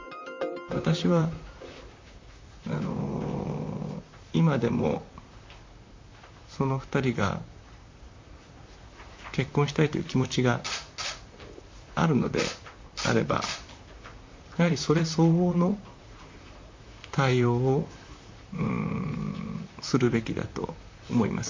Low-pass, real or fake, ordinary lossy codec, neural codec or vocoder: 7.2 kHz; fake; MP3, 48 kbps; vocoder, 44.1 kHz, 128 mel bands, Pupu-Vocoder